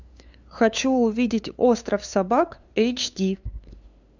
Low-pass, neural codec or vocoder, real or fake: 7.2 kHz; codec, 16 kHz, 2 kbps, FunCodec, trained on LibriTTS, 25 frames a second; fake